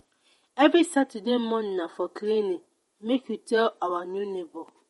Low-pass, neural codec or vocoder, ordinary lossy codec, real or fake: 19.8 kHz; vocoder, 48 kHz, 128 mel bands, Vocos; MP3, 48 kbps; fake